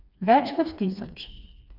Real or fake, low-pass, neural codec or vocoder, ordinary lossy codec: fake; 5.4 kHz; codec, 16 kHz, 2 kbps, FreqCodec, smaller model; none